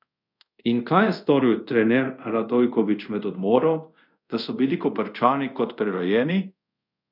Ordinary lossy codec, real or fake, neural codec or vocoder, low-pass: none; fake; codec, 24 kHz, 0.5 kbps, DualCodec; 5.4 kHz